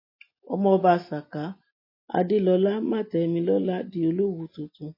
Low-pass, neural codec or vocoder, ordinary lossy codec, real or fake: 5.4 kHz; none; MP3, 24 kbps; real